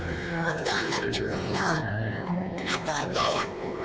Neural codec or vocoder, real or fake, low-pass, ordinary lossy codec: codec, 16 kHz, 2 kbps, X-Codec, WavLM features, trained on Multilingual LibriSpeech; fake; none; none